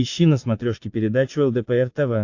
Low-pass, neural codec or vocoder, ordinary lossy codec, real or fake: 7.2 kHz; vocoder, 44.1 kHz, 80 mel bands, Vocos; AAC, 48 kbps; fake